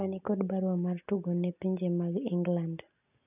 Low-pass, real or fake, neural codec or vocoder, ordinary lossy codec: 3.6 kHz; real; none; none